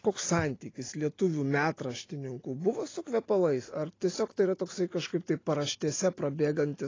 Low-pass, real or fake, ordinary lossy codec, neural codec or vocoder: 7.2 kHz; real; AAC, 32 kbps; none